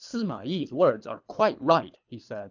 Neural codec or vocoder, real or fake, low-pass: codec, 24 kHz, 3 kbps, HILCodec; fake; 7.2 kHz